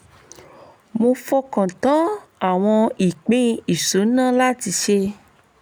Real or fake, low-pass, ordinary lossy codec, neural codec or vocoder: real; none; none; none